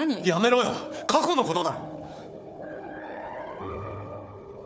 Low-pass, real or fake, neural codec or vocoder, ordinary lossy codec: none; fake; codec, 16 kHz, 4 kbps, FunCodec, trained on Chinese and English, 50 frames a second; none